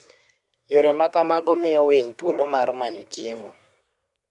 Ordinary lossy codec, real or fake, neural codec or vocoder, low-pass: none; fake; codec, 24 kHz, 1 kbps, SNAC; 10.8 kHz